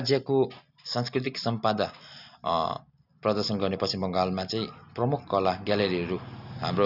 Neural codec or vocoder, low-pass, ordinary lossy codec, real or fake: none; 5.4 kHz; none; real